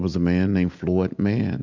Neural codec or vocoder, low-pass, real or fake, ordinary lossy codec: none; 7.2 kHz; real; MP3, 64 kbps